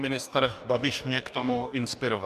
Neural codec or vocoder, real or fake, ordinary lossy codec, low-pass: codec, 44.1 kHz, 2.6 kbps, DAC; fake; MP3, 96 kbps; 14.4 kHz